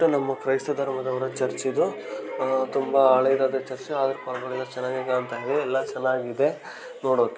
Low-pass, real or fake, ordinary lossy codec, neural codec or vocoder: none; real; none; none